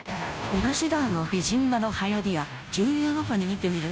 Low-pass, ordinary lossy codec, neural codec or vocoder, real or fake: none; none; codec, 16 kHz, 0.5 kbps, FunCodec, trained on Chinese and English, 25 frames a second; fake